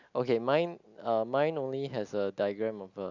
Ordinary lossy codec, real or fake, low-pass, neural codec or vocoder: none; real; 7.2 kHz; none